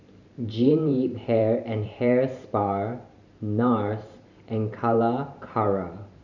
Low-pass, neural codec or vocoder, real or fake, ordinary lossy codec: 7.2 kHz; vocoder, 44.1 kHz, 128 mel bands every 512 samples, BigVGAN v2; fake; none